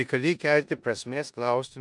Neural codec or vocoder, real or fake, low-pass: codec, 16 kHz in and 24 kHz out, 0.9 kbps, LongCat-Audio-Codec, four codebook decoder; fake; 10.8 kHz